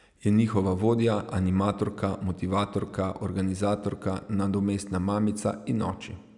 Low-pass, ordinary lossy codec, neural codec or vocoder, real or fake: 10.8 kHz; none; none; real